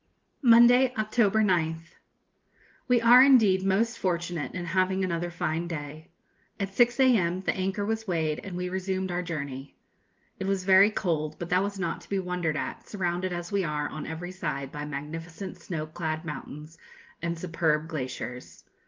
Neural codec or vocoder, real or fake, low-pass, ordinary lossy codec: none; real; 7.2 kHz; Opus, 32 kbps